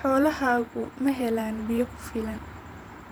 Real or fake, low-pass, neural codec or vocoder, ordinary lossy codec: fake; none; vocoder, 44.1 kHz, 128 mel bands every 512 samples, BigVGAN v2; none